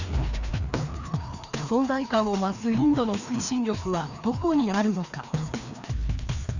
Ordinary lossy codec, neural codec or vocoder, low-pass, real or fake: none; codec, 16 kHz, 2 kbps, FreqCodec, larger model; 7.2 kHz; fake